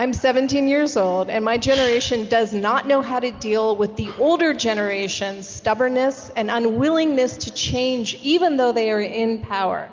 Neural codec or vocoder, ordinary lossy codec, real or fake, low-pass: none; Opus, 24 kbps; real; 7.2 kHz